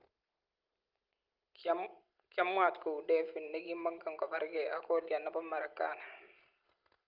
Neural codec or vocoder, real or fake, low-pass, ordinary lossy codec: none; real; 5.4 kHz; Opus, 32 kbps